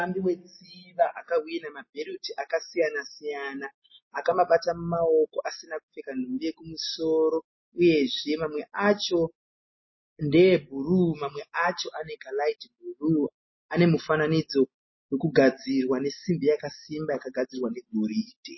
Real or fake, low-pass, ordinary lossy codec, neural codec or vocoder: real; 7.2 kHz; MP3, 24 kbps; none